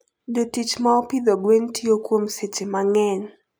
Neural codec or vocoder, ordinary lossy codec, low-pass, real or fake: none; none; none; real